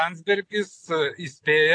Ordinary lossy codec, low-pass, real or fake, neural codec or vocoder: AAC, 48 kbps; 9.9 kHz; real; none